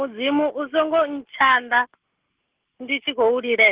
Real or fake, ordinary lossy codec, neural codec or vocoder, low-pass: real; Opus, 16 kbps; none; 3.6 kHz